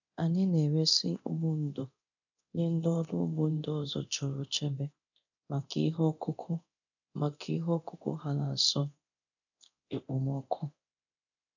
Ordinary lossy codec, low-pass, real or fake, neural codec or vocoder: none; 7.2 kHz; fake; codec, 24 kHz, 0.9 kbps, DualCodec